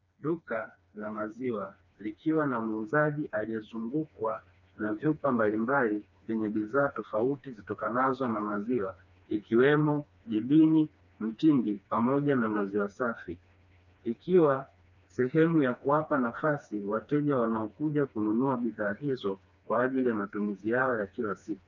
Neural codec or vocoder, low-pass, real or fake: codec, 16 kHz, 2 kbps, FreqCodec, smaller model; 7.2 kHz; fake